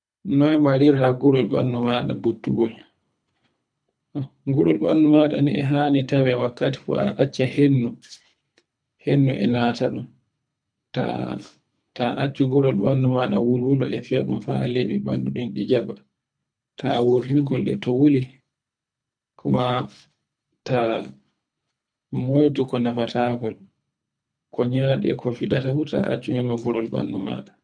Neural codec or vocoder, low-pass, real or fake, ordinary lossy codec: codec, 24 kHz, 3 kbps, HILCodec; 9.9 kHz; fake; none